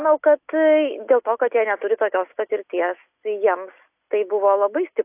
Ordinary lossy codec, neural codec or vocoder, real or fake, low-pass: AAC, 32 kbps; none; real; 3.6 kHz